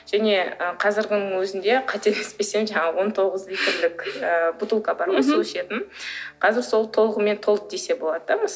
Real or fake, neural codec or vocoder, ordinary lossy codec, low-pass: real; none; none; none